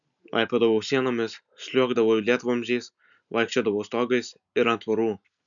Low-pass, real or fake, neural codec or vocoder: 7.2 kHz; real; none